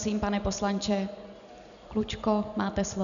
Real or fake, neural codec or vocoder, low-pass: real; none; 7.2 kHz